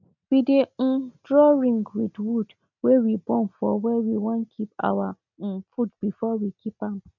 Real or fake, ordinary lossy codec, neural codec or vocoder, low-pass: real; none; none; 7.2 kHz